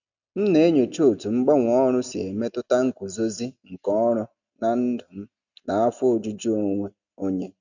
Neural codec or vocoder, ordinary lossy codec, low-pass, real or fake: none; none; 7.2 kHz; real